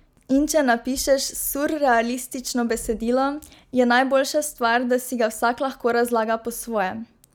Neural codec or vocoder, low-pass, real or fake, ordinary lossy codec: none; none; real; none